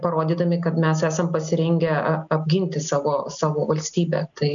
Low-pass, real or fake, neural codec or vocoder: 7.2 kHz; real; none